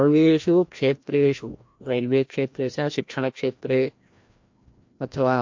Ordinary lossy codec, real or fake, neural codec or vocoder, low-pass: MP3, 48 kbps; fake; codec, 16 kHz, 1 kbps, FreqCodec, larger model; 7.2 kHz